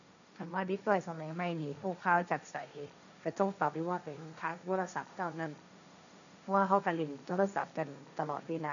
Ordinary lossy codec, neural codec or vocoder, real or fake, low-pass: none; codec, 16 kHz, 1.1 kbps, Voila-Tokenizer; fake; 7.2 kHz